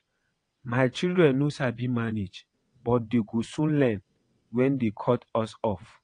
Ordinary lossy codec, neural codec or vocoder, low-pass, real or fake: AAC, 48 kbps; vocoder, 22.05 kHz, 80 mel bands, WaveNeXt; 9.9 kHz; fake